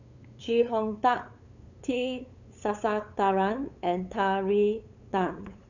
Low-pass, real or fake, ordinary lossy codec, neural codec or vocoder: 7.2 kHz; fake; none; codec, 16 kHz, 8 kbps, FunCodec, trained on LibriTTS, 25 frames a second